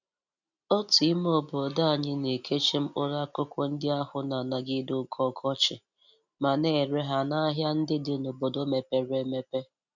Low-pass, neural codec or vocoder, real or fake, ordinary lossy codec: 7.2 kHz; none; real; none